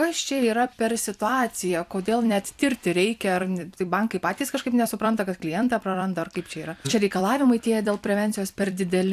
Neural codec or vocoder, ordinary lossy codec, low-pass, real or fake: vocoder, 44.1 kHz, 128 mel bands every 256 samples, BigVGAN v2; AAC, 96 kbps; 14.4 kHz; fake